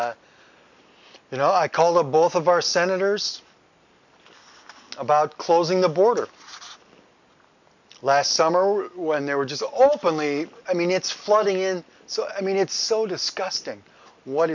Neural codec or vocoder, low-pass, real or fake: none; 7.2 kHz; real